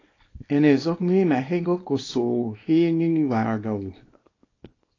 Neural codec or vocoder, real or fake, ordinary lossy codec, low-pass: codec, 24 kHz, 0.9 kbps, WavTokenizer, small release; fake; AAC, 32 kbps; 7.2 kHz